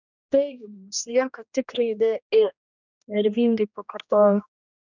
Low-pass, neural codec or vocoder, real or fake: 7.2 kHz; codec, 16 kHz, 1 kbps, X-Codec, HuBERT features, trained on general audio; fake